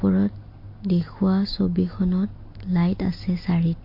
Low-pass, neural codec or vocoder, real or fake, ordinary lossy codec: 5.4 kHz; none; real; MP3, 32 kbps